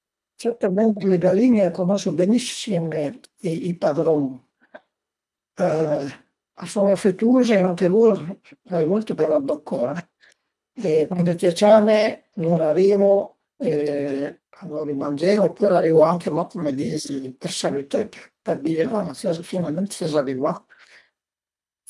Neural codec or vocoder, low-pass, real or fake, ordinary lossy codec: codec, 24 kHz, 1.5 kbps, HILCodec; none; fake; none